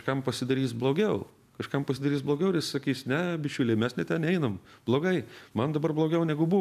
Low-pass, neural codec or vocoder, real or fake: 14.4 kHz; none; real